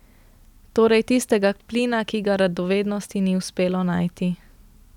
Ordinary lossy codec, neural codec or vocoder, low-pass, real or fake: none; none; 19.8 kHz; real